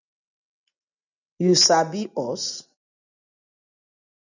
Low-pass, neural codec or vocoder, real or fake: 7.2 kHz; none; real